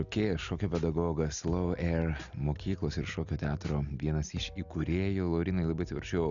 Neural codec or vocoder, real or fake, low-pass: none; real; 7.2 kHz